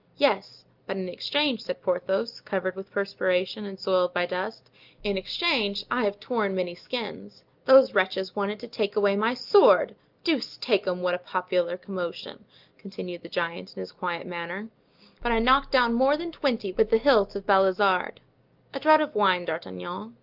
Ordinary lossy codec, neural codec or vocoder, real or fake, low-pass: Opus, 24 kbps; none; real; 5.4 kHz